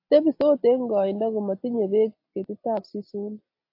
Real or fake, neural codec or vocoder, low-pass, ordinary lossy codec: real; none; 5.4 kHz; AAC, 32 kbps